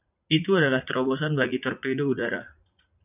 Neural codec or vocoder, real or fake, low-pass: vocoder, 44.1 kHz, 80 mel bands, Vocos; fake; 3.6 kHz